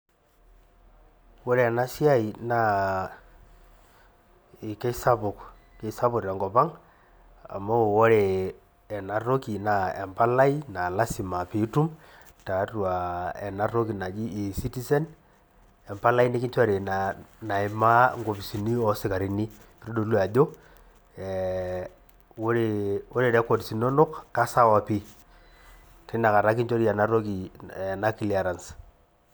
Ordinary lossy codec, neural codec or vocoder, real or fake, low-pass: none; none; real; none